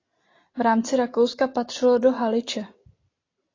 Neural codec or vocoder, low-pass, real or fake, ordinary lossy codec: none; 7.2 kHz; real; AAC, 32 kbps